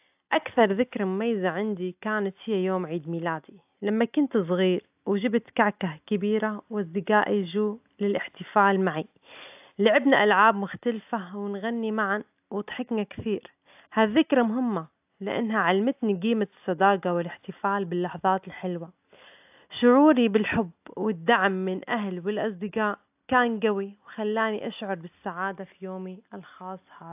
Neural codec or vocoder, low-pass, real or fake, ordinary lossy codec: none; 3.6 kHz; real; AAC, 32 kbps